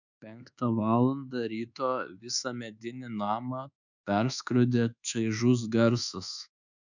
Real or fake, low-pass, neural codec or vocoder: fake; 7.2 kHz; codec, 24 kHz, 1.2 kbps, DualCodec